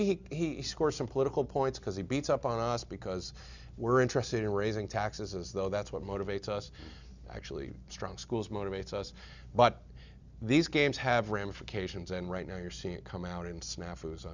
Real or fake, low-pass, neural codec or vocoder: real; 7.2 kHz; none